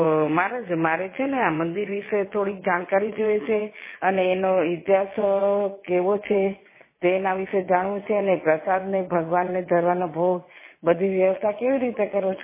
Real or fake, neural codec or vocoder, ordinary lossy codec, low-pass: fake; vocoder, 22.05 kHz, 80 mel bands, WaveNeXt; MP3, 16 kbps; 3.6 kHz